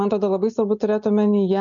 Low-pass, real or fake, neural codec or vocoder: 7.2 kHz; real; none